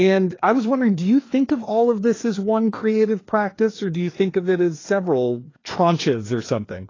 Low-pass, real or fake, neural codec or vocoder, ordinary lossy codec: 7.2 kHz; fake; codec, 16 kHz, 2 kbps, FreqCodec, larger model; AAC, 32 kbps